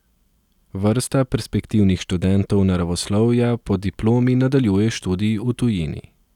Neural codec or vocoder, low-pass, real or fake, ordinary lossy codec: none; 19.8 kHz; real; none